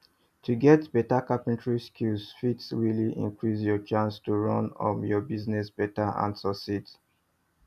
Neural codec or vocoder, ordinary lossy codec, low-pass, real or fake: none; none; 14.4 kHz; real